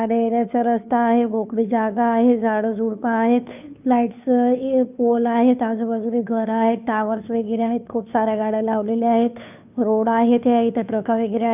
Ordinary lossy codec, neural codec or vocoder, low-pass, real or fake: none; codec, 16 kHz, 2 kbps, FunCodec, trained on Chinese and English, 25 frames a second; 3.6 kHz; fake